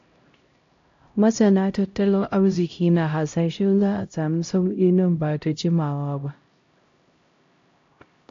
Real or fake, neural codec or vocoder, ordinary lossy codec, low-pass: fake; codec, 16 kHz, 0.5 kbps, X-Codec, HuBERT features, trained on LibriSpeech; AAC, 48 kbps; 7.2 kHz